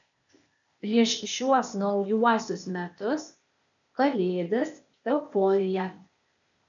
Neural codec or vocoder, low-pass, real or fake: codec, 16 kHz, 0.8 kbps, ZipCodec; 7.2 kHz; fake